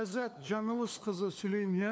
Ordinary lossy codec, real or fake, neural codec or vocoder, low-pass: none; fake; codec, 16 kHz, 2 kbps, FunCodec, trained on LibriTTS, 25 frames a second; none